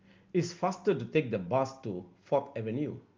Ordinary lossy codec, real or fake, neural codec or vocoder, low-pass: Opus, 24 kbps; real; none; 7.2 kHz